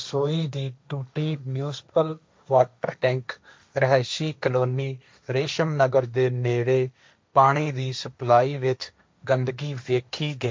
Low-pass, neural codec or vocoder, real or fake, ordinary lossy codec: none; codec, 16 kHz, 1.1 kbps, Voila-Tokenizer; fake; none